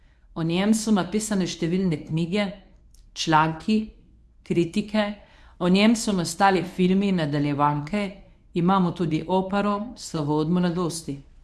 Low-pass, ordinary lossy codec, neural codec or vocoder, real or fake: none; none; codec, 24 kHz, 0.9 kbps, WavTokenizer, medium speech release version 1; fake